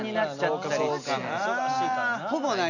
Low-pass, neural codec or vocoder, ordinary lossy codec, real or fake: 7.2 kHz; none; none; real